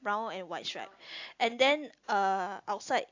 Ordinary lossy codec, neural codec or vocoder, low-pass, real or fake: AAC, 48 kbps; none; 7.2 kHz; real